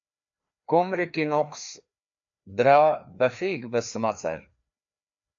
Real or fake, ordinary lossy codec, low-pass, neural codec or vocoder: fake; AAC, 64 kbps; 7.2 kHz; codec, 16 kHz, 2 kbps, FreqCodec, larger model